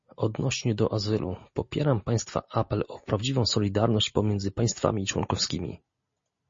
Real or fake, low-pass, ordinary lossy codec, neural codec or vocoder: real; 7.2 kHz; MP3, 32 kbps; none